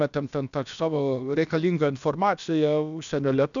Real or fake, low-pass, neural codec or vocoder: fake; 7.2 kHz; codec, 16 kHz, about 1 kbps, DyCAST, with the encoder's durations